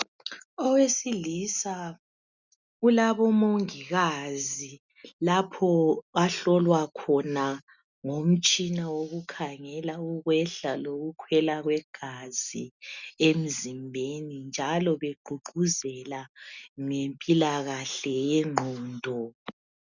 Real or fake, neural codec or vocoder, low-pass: real; none; 7.2 kHz